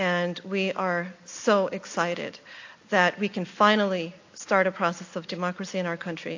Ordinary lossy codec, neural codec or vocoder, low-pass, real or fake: AAC, 48 kbps; none; 7.2 kHz; real